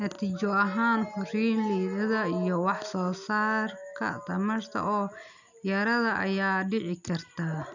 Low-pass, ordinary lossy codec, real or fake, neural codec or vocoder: 7.2 kHz; none; real; none